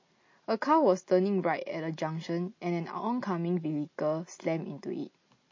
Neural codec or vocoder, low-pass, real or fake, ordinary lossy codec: none; 7.2 kHz; real; MP3, 32 kbps